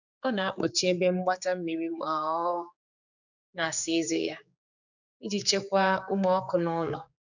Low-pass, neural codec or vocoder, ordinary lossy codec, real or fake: 7.2 kHz; codec, 16 kHz, 4 kbps, X-Codec, HuBERT features, trained on general audio; none; fake